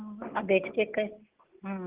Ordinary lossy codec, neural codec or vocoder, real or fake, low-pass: Opus, 16 kbps; none; real; 3.6 kHz